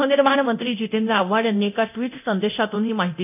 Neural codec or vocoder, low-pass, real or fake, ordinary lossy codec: codec, 24 kHz, 0.9 kbps, DualCodec; 3.6 kHz; fake; none